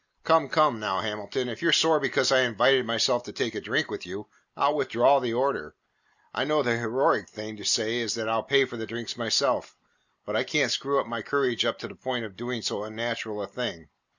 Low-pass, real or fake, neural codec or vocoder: 7.2 kHz; real; none